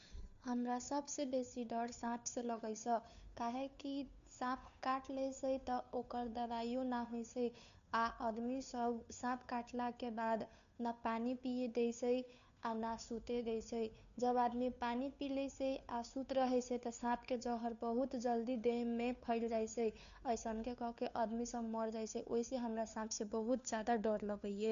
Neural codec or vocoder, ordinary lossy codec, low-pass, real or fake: codec, 16 kHz, 2 kbps, FunCodec, trained on Chinese and English, 25 frames a second; none; 7.2 kHz; fake